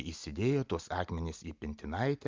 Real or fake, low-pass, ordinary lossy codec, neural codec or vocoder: real; 7.2 kHz; Opus, 24 kbps; none